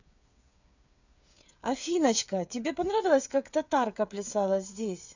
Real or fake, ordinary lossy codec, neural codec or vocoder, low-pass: fake; none; codec, 16 kHz, 8 kbps, FreqCodec, smaller model; 7.2 kHz